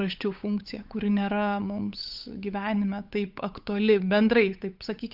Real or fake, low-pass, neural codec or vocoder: fake; 5.4 kHz; codec, 16 kHz, 8 kbps, FunCodec, trained on Chinese and English, 25 frames a second